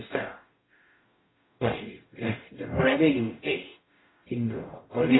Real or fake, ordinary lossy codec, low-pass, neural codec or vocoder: fake; AAC, 16 kbps; 7.2 kHz; codec, 44.1 kHz, 0.9 kbps, DAC